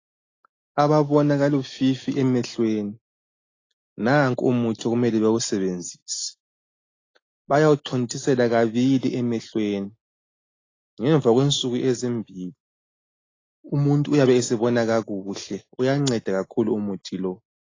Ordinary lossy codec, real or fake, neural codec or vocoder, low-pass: AAC, 32 kbps; real; none; 7.2 kHz